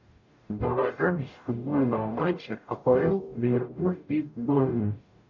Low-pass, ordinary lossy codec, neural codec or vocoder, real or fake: 7.2 kHz; MP3, 48 kbps; codec, 44.1 kHz, 0.9 kbps, DAC; fake